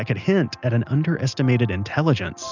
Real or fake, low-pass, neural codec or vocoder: real; 7.2 kHz; none